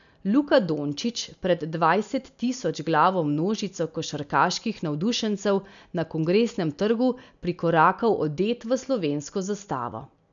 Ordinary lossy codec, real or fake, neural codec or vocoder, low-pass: none; real; none; 7.2 kHz